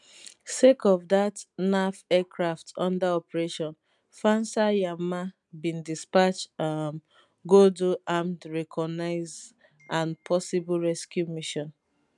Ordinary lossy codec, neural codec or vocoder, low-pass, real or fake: none; none; 10.8 kHz; real